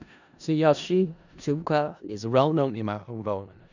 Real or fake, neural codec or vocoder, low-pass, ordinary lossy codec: fake; codec, 16 kHz in and 24 kHz out, 0.4 kbps, LongCat-Audio-Codec, four codebook decoder; 7.2 kHz; none